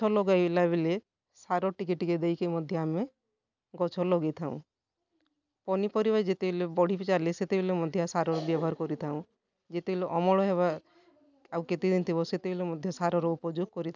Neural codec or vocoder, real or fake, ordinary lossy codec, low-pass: none; real; none; 7.2 kHz